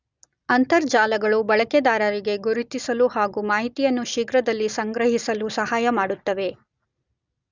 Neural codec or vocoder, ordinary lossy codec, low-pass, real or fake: none; Opus, 64 kbps; 7.2 kHz; real